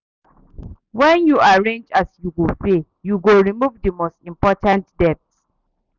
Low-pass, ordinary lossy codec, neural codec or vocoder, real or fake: 7.2 kHz; none; none; real